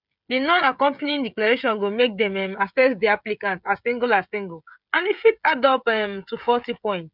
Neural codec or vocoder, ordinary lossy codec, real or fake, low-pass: codec, 16 kHz, 16 kbps, FreqCodec, smaller model; none; fake; 5.4 kHz